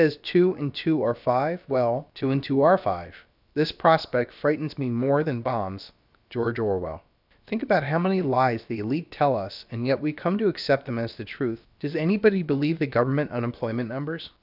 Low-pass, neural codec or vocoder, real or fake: 5.4 kHz; codec, 16 kHz, about 1 kbps, DyCAST, with the encoder's durations; fake